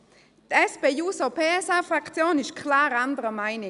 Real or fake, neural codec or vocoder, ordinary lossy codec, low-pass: real; none; none; 10.8 kHz